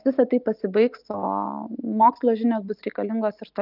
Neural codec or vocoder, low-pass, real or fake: none; 5.4 kHz; real